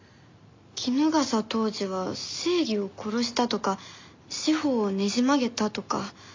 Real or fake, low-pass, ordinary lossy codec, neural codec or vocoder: real; 7.2 kHz; MP3, 48 kbps; none